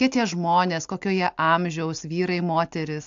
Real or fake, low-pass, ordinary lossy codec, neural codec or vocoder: real; 7.2 kHz; AAC, 64 kbps; none